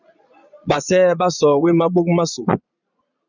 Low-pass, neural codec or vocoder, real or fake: 7.2 kHz; vocoder, 22.05 kHz, 80 mel bands, Vocos; fake